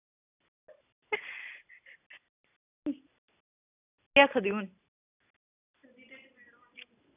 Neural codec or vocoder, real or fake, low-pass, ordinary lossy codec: none; real; 3.6 kHz; none